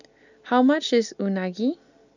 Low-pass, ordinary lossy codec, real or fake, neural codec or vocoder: 7.2 kHz; none; real; none